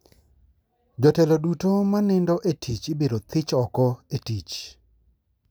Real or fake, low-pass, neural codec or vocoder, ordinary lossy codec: real; none; none; none